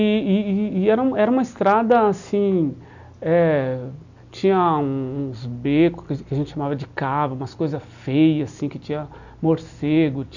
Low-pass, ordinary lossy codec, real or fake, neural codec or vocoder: 7.2 kHz; none; real; none